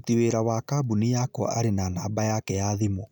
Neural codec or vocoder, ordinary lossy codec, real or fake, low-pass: none; none; real; none